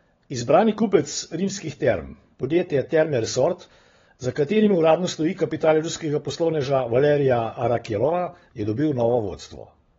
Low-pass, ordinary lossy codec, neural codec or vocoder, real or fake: 7.2 kHz; AAC, 24 kbps; codec, 16 kHz, 16 kbps, FunCodec, trained on LibriTTS, 50 frames a second; fake